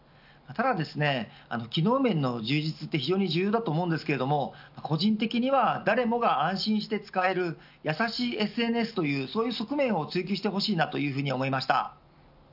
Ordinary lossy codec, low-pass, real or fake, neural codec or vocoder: none; 5.4 kHz; real; none